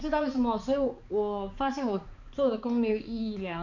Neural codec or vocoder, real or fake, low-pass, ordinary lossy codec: codec, 16 kHz, 4 kbps, X-Codec, HuBERT features, trained on balanced general audio; fake; 7.2 kHz; none